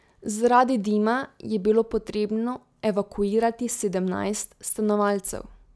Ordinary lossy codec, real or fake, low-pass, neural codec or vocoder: none; real; none; none